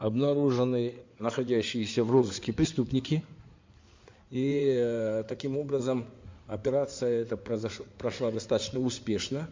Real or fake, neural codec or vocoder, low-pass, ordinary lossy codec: fake; codec, 16 kHz in and 24 kHz out, 2.2 kbps, FireRedTTS-2 codec; 7.2 kHz; MP3, 64 kbps